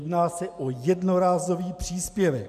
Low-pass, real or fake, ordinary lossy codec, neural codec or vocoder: 14.4 kHz; real; AAC, 96 kbps; none